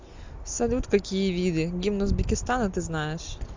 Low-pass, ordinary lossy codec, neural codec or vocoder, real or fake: 7.2 kHz; MP3, 64 kbps; none; real